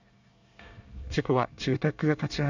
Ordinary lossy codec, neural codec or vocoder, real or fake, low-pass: none; codec, 24 kHz, 1 kbps, SNAC; fake; 7.2 kHz